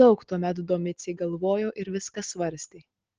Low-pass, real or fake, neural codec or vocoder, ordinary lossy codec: 7.2 kHz; real; none; Opus, 16 kbps